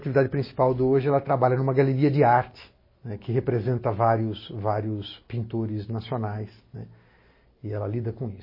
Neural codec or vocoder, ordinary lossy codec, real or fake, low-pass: none; MP3, 24 kbps; real; 5.4 kHz